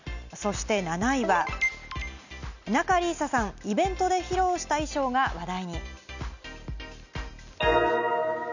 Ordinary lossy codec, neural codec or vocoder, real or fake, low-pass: none; none; real; 7.2 kHz